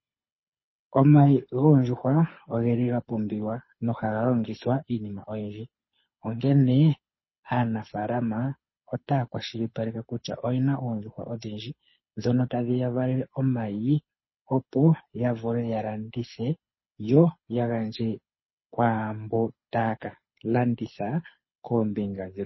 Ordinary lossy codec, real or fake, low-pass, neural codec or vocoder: MP3, 24 kbps; fake; 7.2 kHz; codec, 24 kHz, 6 kbps, HILCodec